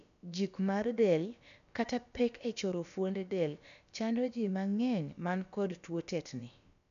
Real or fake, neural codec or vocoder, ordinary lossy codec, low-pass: fake; codec, 16 kHz, about 1 kbps, DyCAST, with the encoder's durations; none; 7.2 kHz